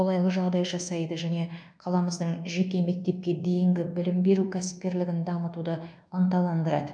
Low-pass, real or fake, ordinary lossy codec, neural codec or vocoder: 9.9 kHz; fake; none; codec, 24 kHz, 1.2 kbps, DualCodec